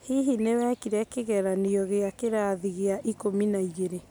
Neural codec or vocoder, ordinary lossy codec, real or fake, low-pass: none; none; real; none